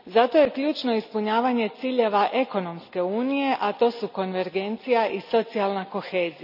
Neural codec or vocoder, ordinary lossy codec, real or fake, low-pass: none; none; real; 5.4 kHz